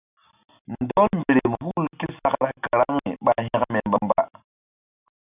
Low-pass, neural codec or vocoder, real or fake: 3.6 kHz; none; real